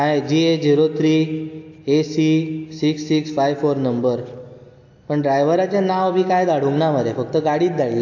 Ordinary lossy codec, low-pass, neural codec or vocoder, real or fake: AAC, 48 kbps; 7.2 kHz; none; real